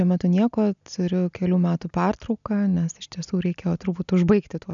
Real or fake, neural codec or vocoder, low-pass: real; none; 7.2 kHz